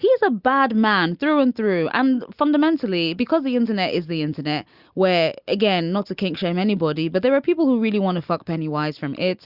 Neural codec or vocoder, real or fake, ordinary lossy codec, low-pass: none; real; Opus, 64 kbps; 5.4 kHz